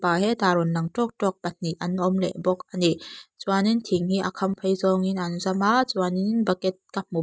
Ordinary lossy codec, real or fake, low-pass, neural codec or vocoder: none; real; none; none